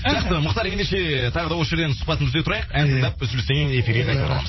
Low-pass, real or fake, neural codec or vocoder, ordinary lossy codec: 7.2 kHz; fake; vocoder, 44.1 kHz, 80 mel bands, Vocos; MP3, 24 kbps